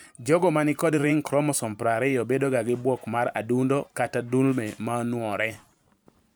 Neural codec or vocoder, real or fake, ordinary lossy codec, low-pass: vocoder, 44.1 kHz, 128 mel bands every 512 samples, BigVGAN v2; fake; none; none